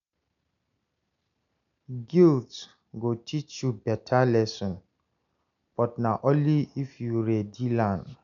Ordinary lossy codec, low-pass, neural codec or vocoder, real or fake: none; 7.2 kHz; none; real